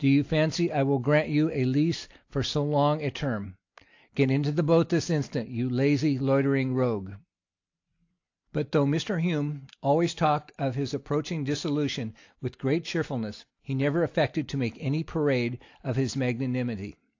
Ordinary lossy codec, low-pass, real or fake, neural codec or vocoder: AAC, 48 kbps; 7.2 kHz; real; none